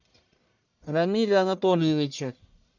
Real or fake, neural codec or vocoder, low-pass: fake; codec, 44.1 kHz, 1.7 kbps, Pupu-Codec; 7.2 kHz